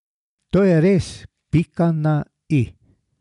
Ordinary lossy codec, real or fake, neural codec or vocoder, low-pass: none; real; none; 10.8 kHz